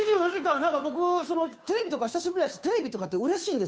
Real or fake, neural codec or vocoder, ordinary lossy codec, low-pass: fake; codec, 16 kHz, 2 kbps, FunCodec, trained on Chinese and English, 25 frames a second; none; none